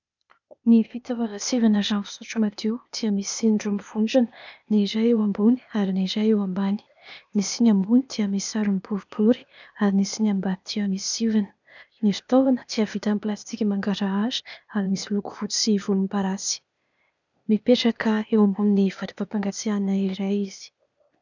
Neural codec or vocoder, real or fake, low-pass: codec, 16 kHz, 0.8 kbps, ZipCodec; fake; 7.2 kHz